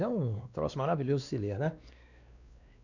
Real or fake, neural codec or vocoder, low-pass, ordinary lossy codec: fake; codec, 16 kHz, 2 kbps, X-Codec, WavLM features, trained on Multilingual LibriSpeech; 7.2 kHz; none